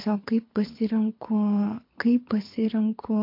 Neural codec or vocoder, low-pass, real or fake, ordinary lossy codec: codec, 24 kHz, 6 kbps, HILCodec; 5.4 kHz; fake; MP3, 32 kbps